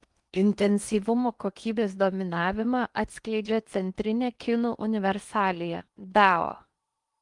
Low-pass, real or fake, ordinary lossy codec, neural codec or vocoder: 10.8 kHz; fake; Opus, 32 kbps; codec, 16 kHz in and 24 kHz out, 0.8 kbps, FocalCodec, streaming, 65536 codes